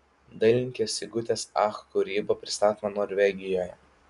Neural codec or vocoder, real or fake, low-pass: none; real; 10.8 kHz